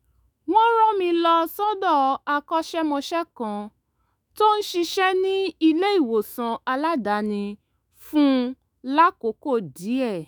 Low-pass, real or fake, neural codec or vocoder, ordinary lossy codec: none; fake; autoencoder, 48 kHz, 128 numbers a frame, DAC-VAE, trained on Japanese speech; none